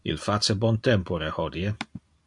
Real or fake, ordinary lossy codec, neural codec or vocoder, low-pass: real; MP3, 64 kbps; none; 10.8 kHz